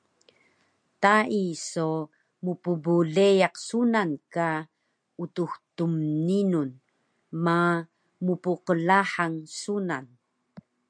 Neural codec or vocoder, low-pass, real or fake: none; 9.9 kHz; real